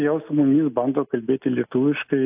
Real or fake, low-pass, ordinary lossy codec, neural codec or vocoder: real; 3.6 kHz; AAC, 32 kbps; none